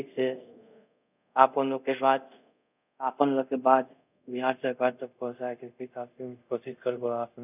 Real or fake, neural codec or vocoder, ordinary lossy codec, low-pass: fake; codec, 24 kHz, 0.5 kbps, DualCodec; none; 3.6 kHz